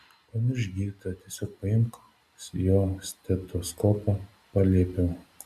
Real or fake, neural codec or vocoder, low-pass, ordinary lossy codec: real; none; 14.4 kHz; Opus, 64 kbps